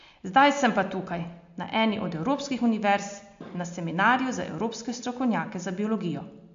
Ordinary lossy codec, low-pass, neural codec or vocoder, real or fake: MP3, 48 kbps; 7.2 kHz; none; real